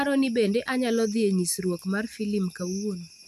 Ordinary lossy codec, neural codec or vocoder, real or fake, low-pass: none; none; real; 14.4 kHz